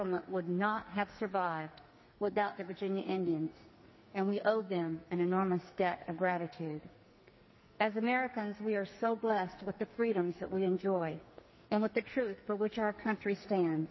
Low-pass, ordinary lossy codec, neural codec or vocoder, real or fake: 7.2 kHz; MP3, 24 kbps; codec, 44.1 kHz, 2.6 kbps, SNAC; fake